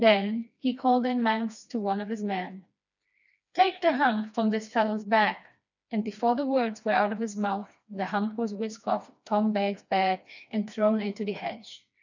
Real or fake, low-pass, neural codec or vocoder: fake; 7.2 kHz; codec, 16 kHz, 2 kbps, FreqCodec, smaller model